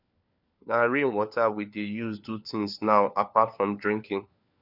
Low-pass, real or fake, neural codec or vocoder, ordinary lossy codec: 5.4 kHz; fake; codec, 16 kHz, 4 kbps, FunCodec, trained on LibriTTS, 50 frames a second; none